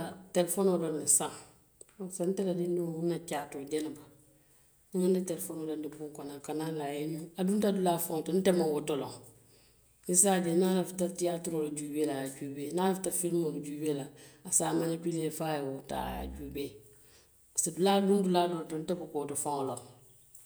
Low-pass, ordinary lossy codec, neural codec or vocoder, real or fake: none; none; vocoder, 48 kHz, 128 mel bands, Vocos; fake